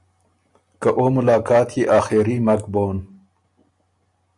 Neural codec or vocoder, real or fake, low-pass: none; real; 10.8 kHz